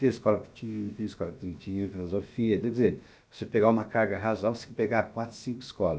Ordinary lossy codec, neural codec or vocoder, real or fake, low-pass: none; codec, 16 kHz, about 1 kbps, DyCAST, with the encoder's durations; fake; none